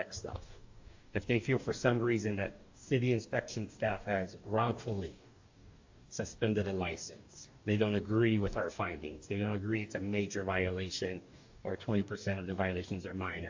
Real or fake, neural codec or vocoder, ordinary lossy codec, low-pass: fake; codec, 44.1 kHz, 2.6 kbps, DAC; AAC, 48 kbps; 7.2 kHz